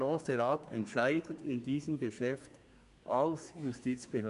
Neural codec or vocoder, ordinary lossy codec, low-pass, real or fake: codec, 24 kHz, 1 kbps, SNAC; none; 10.8 kHz; fake